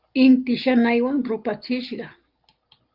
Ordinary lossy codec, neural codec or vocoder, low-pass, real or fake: Opus, 32 kbps; none; 5.4 kHz; real